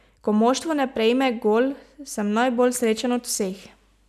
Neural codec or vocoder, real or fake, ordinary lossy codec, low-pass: none; real; none; 14.4 kHz